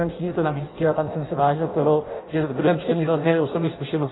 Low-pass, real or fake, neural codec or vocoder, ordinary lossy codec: 7.2 kHz; fake; codec, 16 kHz in and 24 kHz out, 0.6 kbps, FireRedTTS-2 codec; AAC, 16 kbps